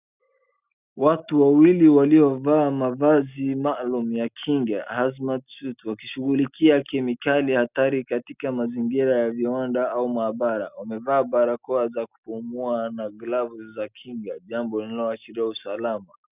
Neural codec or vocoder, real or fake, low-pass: none; real; 3.6 kHz